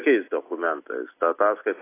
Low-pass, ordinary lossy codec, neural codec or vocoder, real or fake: 3.6 kHz; AAC, 24 kbps; none; real